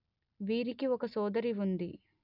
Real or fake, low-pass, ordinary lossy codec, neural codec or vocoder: real; 5.4 kHz; none; none